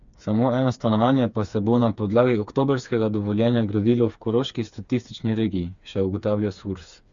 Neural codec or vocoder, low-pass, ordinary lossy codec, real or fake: codec, 16 kHz, 4 kbps, FreqCodec, smaller model; 7.2 kHz; Opus, 64 kbps; fake